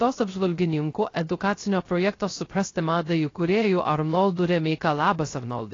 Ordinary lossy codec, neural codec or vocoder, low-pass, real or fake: AAC, 32 kbps; codec, 16 kHz, 0.3 kbps, FocalCodec; 7.2 kHz; fake